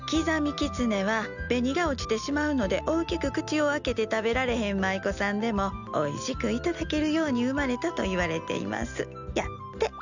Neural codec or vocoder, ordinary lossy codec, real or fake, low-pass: none; none; real; 7.2 kHz